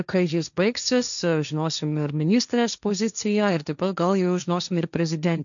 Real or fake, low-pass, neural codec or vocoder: fake; 7.2 kHz; codec, 16 kHz, 1.1 kbps, Voila-Tokenizer